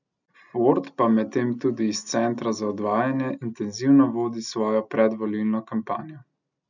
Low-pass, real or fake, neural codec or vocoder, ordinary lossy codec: 7.2 kHz; real; none; none